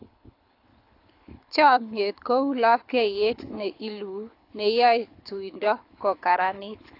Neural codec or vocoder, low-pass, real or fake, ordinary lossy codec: codec, 24 kHz, 6 kbps, HILCodec; 5.4 kHz; fake; AAC, 32 kbps